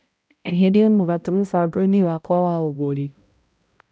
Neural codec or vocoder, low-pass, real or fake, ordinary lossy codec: codec, 16 kHz, 0.5 kbps, X-Codec, HuBERT features, trained on balanced general audio; none; fake; none